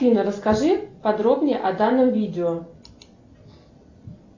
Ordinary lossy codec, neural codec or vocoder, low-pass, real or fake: AAC, 48 kbps; none; 7.2 kHz; real